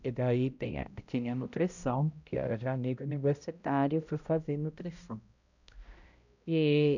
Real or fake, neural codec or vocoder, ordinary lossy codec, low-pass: fake; codec, 16 kHz, 0.5 kbps, X-Codec, HuBERT features, trained on balanced general audio; none; 7.2 kHz